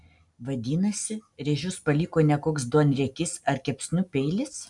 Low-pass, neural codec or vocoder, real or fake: 10.8 kHz; none; real